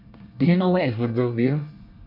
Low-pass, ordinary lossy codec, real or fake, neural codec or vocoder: 5.4 kHz; MP3, 48 kbps; fake; codec, 24 kHz, 1 kbps, SNAC